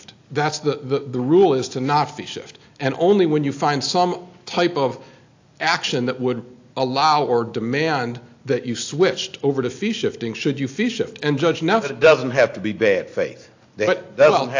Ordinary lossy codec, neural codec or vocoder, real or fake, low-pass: AAC, 48 kbps; none; real; 7.2 kHz